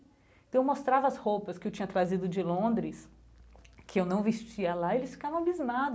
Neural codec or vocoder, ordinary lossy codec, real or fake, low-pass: none; none; real; none